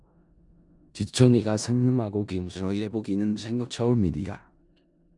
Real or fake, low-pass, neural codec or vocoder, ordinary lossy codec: fake; 10.8 kHz; codec, 16 kHz in and 24 kHz out, 0.4 kbps, LongCat-Audio-Codec, four codebook decoder; MP3, 96 kbps